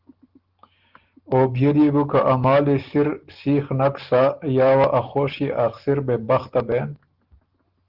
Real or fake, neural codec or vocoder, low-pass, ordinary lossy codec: real; none; 5.4 kHz; Opus, 16 kbps